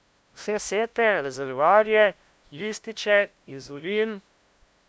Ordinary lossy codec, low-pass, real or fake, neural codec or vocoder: none; none; fake; codec, 16 kHz, 0.5 kbps, FunCodec, trained on LibriTTS, 25 frames a second